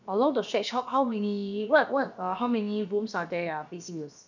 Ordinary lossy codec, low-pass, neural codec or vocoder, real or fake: none; 7.2 kHz; codec, 16 kHz, about 1 kbps, DyCAST, with the encoder's durations; fake